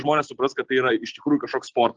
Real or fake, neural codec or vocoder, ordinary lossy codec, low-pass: real; none; Opus, 16 kbps; 7.2 kHz